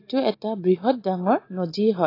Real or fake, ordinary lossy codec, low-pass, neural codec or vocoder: real; AAC, 24 kbps; 5.4 kHz; none